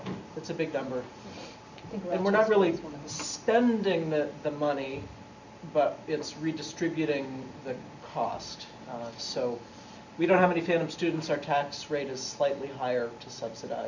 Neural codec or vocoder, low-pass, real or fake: none; 7.2 kHz; real